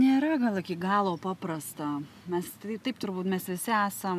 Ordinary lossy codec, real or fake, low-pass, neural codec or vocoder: AAC, 96 kbps; real; 14.4 kHz; none